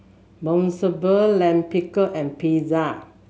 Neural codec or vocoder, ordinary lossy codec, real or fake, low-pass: none; none; real; none